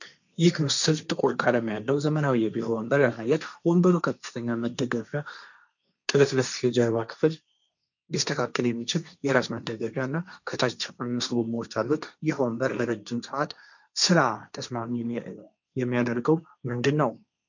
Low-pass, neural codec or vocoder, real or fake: 7.2 kHz; codec, 16 kHz, 1.1 kbps, Voila-Tokenizer; fake